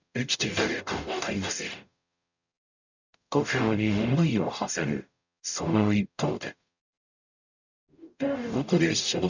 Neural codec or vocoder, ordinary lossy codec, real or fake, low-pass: codec, 44.1 kHz, 0.9 kbps, DAC; none; fake; 7.2 kHz